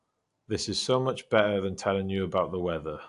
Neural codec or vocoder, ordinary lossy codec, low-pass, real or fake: none; AAC, 64 kbps; 10.8 kHz; real